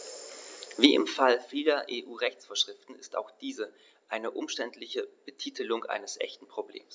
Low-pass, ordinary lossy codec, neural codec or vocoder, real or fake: none; none; none; real